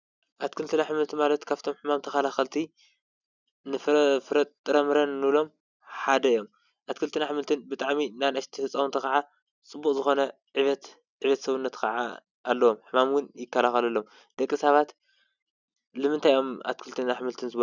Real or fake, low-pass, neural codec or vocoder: real; 7.2 kHz; none